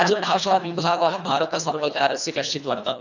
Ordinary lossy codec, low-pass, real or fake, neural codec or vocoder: none; 7.2 kHz; fake; codec, 24 kHz, 1.5 kbps, HILCodec